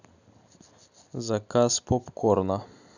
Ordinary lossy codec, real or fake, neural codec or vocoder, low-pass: none; real; none; 7.2 kHz